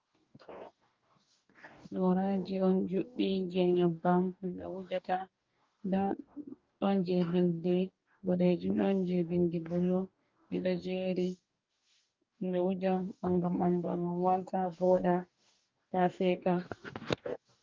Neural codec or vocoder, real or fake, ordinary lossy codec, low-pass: codec, 44.1 kHz, 2.6 kbps, DAC; fake; Opus, 32 kbps; 7.2 kHz